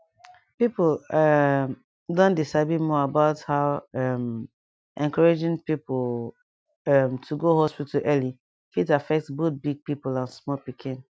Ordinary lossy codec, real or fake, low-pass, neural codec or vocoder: none; real; none; none